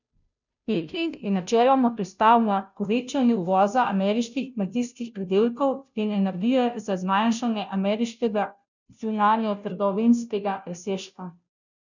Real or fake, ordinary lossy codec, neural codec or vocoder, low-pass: fake; none; codec, 16 kHz, 0.5 kbps, FunCodec, trained on Chinese and English, 25 frames a second; 7.2 kHz